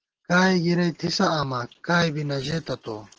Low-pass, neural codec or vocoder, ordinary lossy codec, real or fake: 7.2 kHz; none; Opus, 16 kbps; real